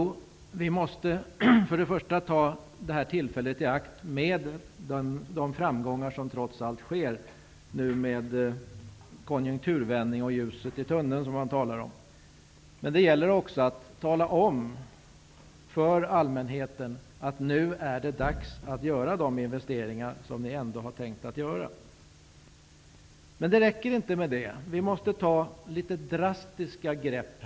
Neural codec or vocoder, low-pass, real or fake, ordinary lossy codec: none; none; real; none